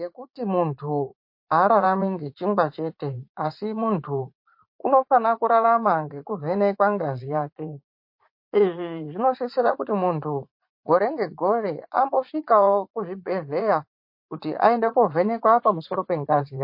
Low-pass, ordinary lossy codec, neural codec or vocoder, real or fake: 5.4 kHz; MP3, 32 kbps; vocoder, 44.1 kHz, 80 mel bands, Vocos; fake